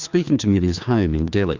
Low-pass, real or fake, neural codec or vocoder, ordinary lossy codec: 7.2 kHz; fake; codec, 16 kHz, 2 kbps, FreqCodec, larger model; Opus, 64 kbps